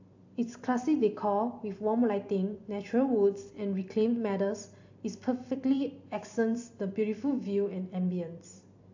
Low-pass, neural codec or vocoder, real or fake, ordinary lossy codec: 7.2 kHz; vocoder, 44.1 kHz, 128 mel bands every 256 samples, BigVGAN v2; fake; AAC, 48 kbps